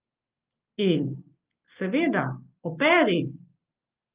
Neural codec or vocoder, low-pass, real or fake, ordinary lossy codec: none; 3.6 kHz; real; Opus, 32 kbps